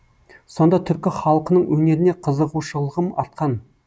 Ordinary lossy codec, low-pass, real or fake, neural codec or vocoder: none; none; real; none